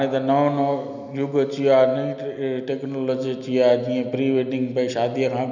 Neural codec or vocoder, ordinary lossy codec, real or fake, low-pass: none; none; real; 7.2 kHz